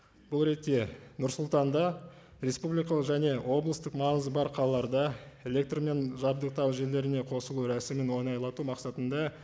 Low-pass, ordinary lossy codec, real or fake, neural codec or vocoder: none; none; real; none